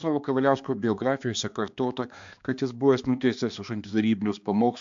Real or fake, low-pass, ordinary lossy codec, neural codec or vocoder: fake; 7.2 kHz; AAC, 64 kbps; codec, 16 kHz, 2 kbps, X-Codec, HuBERT features, trained on balanced general audio